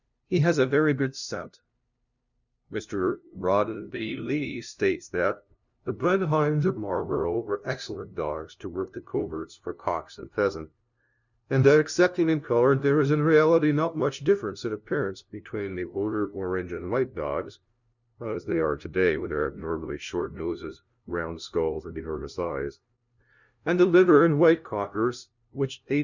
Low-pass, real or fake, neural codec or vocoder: 7.2 kHz; fake; codec, 16 kHz, 0.5 kbps, FunCodec, trained on LibriTTS, 25 frames a second